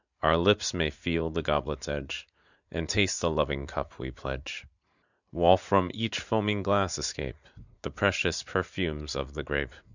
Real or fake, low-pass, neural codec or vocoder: fake; 7.2 kHz; vocoder, 44.1 kHz, 80 mel bands, Vocos